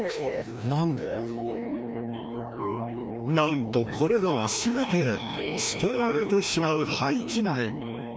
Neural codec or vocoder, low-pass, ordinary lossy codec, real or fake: codec, 16 kHz, 1 kbps, FreqCodec, larger model; none; none; fake